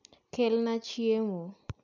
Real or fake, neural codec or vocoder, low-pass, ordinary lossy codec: real; none; 7.2 kHz; none